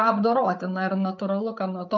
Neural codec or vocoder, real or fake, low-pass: codec, 16 kHz, 8 kbps, FreqCodec, larger model; fake; 7.2 kHz